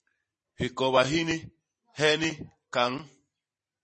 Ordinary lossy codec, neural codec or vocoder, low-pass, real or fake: MP3, 32 kbps; vocoder, 44.1 kHz, 128 mel bands every 512 samples, BigVGAN v2; 10.8 kHz; fake